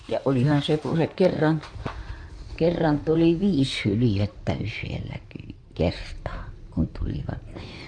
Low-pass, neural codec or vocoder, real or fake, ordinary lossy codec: 9.9 kHz; codec, 16 kHz in and 24 kHz out, 2.2 kbps, FireRedTTS-2 codec; fake; none